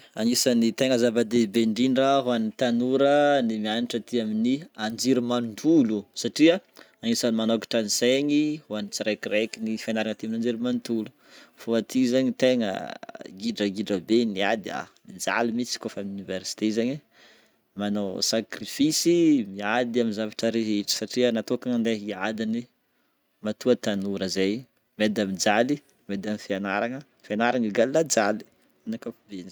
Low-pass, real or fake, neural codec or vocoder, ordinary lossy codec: none; real; none; none